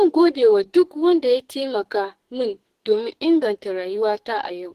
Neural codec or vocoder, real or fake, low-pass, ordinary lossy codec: codec, 44.1 kHz, 2.6 kbps, SNAC; fake; 14.4 kHz; Opus, 16 kbps